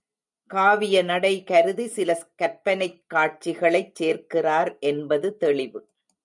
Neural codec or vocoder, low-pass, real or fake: none; 10.8 kHz; real